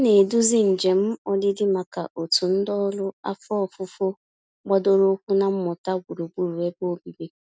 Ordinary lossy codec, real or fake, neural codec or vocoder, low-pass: none; real; none; none